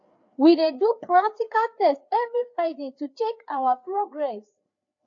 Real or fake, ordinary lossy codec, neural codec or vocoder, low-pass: fake; AAC, 48 kbps; codec, 16 kHz, 4 kbps, FreqCodec, larger model; 7.2 kHz